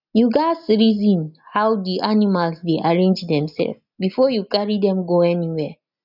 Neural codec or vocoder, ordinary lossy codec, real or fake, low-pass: none; none; real; 5.4 kHz